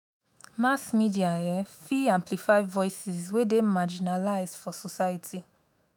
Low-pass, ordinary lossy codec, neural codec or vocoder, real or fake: none; none; autoencoder, 48 kHz, 128 numbers a frame, DAC-VAE, trained on Japanese speech; fake